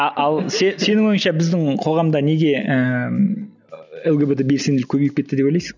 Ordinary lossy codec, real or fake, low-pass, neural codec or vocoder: none; real; 7.2 kHz; none